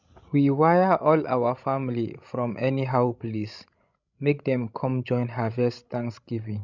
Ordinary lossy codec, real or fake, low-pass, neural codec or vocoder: none; fake; 7.2 kHz; codec, 16 kHz, 16 kbps, FreqCodec, larger model